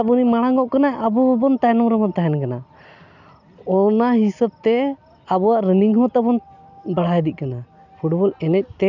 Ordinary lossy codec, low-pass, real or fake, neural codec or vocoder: none; 7.2 kHz; real; none